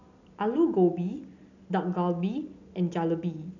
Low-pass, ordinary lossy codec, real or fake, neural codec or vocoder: 7.2 kHz; none; real; none